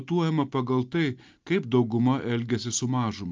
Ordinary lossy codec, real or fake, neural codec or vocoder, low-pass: Opus, 24 kbps; real; none; 7.2 kHz